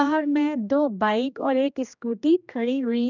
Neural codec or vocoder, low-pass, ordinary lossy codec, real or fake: codec, 16 kHz, 1 kbps, X-Codec, HuBERT features, trained on general audio; 7.2 kHz; none; fake